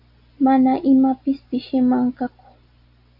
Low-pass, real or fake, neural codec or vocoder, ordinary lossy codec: 5.4 kHz; real; none; AAC, 48 kbps